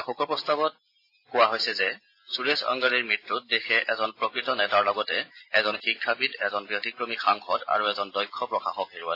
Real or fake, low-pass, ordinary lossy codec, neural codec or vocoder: real; 5.4 kHz; AAC, 32 kbps; none